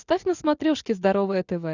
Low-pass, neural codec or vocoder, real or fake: 7.2 kHz; none; real